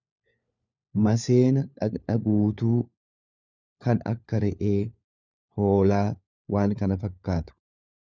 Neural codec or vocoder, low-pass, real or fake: codec, 16 kHz, 4 kbps, FunCodec, trained on LibriTTS, 50 frames a second; 7.2 kHz; fake